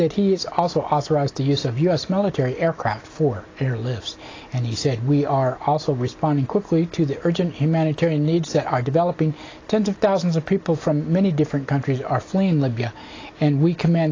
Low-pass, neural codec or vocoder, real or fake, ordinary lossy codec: 7.2 kHz; none; real; AAC, 32 kbps